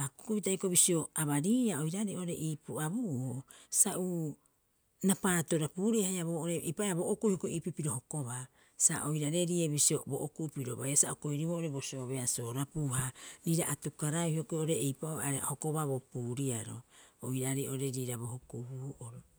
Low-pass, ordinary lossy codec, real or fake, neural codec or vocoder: none; none; real; none